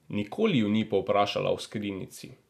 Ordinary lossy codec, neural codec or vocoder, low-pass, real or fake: none; none; 14.4 kHz; real